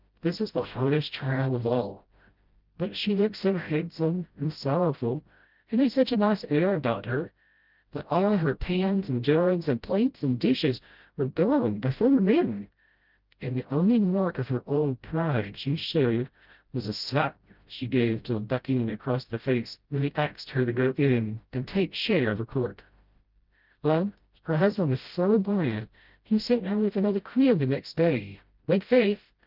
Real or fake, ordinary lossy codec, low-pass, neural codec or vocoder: fake; Opus, 32 kbps; 5.4 kHz; codec, 16 kHz, 0.5 kbps, FreqCodec, smaller model